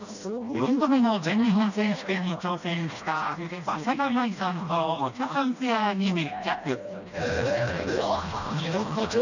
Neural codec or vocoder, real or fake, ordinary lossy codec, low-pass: codec, 16 kHz, 1 kbps, FreqCodec, smaller model; fake; none; 7.2 kHz